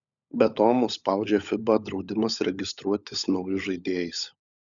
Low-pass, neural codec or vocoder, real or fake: 7.2 kHz; codec, 16 kHz, 16 kbps, FunCodec, trained on LibriTTS, 50 frames a second; fake